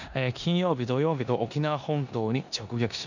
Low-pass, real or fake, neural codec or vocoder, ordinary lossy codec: 7.2 kHz; fake; codec, 16 kHz in and 24 kHz out, 0.9 kbps, LongCat-Audio-Codec, four codebook decoder; none